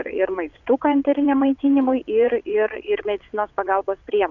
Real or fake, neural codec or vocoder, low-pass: fake; vocoder, 22.05 kHz, 80 mel bands, WaveNeXt; 7.2 kHz